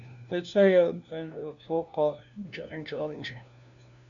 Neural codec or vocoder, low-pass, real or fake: codec, 16 kHz, 1 kbps, FunCodec, trained on LibriTTS, 50 frames a second; 7.2 kHz; fake